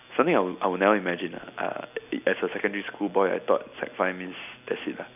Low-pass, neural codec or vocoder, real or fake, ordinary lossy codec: 3.6 kHz; none; real; none